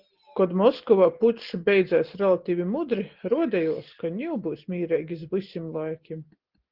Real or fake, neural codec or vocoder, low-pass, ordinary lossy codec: real; none; 5.4 kHz; Opus, 16 kbps